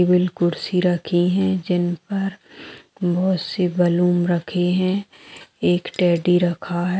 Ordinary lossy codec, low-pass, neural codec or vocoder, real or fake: none; none; none; real